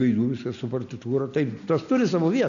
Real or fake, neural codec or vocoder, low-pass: real; none; 7.2 kHz